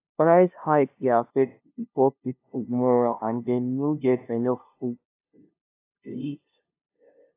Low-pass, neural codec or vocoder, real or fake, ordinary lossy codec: 3.6 kHz; codec, 16 kHz, 0.5 kbps, FunCodec, trained on LibriTTS, 25 frames a second; fake; AAC, 24 kbps